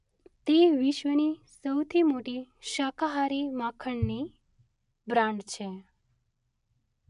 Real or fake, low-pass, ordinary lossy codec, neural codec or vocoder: real; 10.8 kHz; none; none